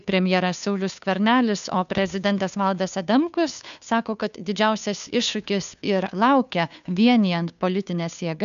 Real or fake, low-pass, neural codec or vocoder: fake; 7.2 kHz; codec, 16 kHz, 2 kbps, FunCodec, trained on Chinese and English, 25 frames a second